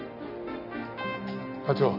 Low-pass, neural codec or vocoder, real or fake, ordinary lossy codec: 5.4 kHz; none; real; none